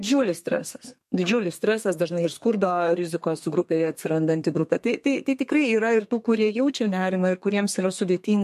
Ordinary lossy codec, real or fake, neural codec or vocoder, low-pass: MP3, 64 kbps; fake; codec, 32 kHz, 1.9 kbps, SNAC; 14.4 kHz